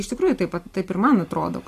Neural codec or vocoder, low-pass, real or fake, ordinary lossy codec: none; 14.4 kHz; real; AAC, 48 kbps